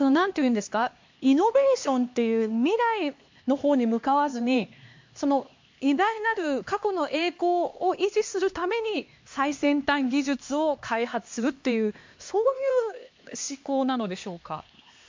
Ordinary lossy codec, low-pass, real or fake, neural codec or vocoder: MP3, 48 kbps; 7.2 kHz; fake; codec, 16 kHz, 2 kbps, X-Codec, HuBERT features, trained on LibriSpeech